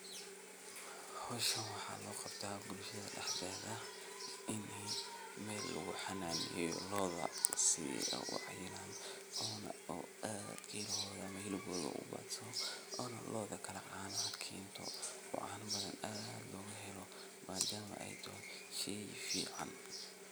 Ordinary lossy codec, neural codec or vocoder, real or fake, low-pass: none; none; real; none